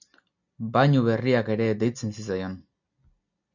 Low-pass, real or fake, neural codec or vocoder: 7.2 kHz; real; none